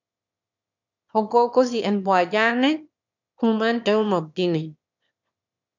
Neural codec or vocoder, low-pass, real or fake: autoencoder, 22.05 kHz, a latent of 192 numbers a frame, VITS, trained on one speaker; 7.2 kHz; fake